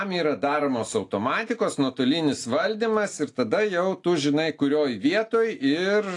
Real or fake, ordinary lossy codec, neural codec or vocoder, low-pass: real; AAC, 48 kbps; none; 10.8 kHz